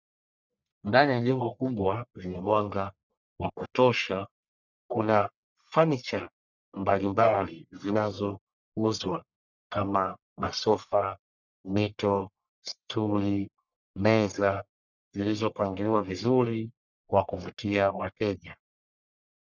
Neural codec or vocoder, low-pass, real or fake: codec, 44.1 kHz, 1.7 kbps, Pupu-Codec; 7.2 kHz; fake